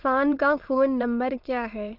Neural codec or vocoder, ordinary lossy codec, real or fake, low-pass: autoencoder, 22.05 kHz, a latent of 192 numbers a frame, VITS, trained on many speakers; Opus, 32 kbps; fake; 5.4 kHz